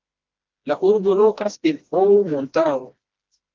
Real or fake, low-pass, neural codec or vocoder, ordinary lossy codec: fake; 7.2 kHz; codec, 16 kHz, 1 kbps, FreqCodec, smaller model; Opus, 32 kbps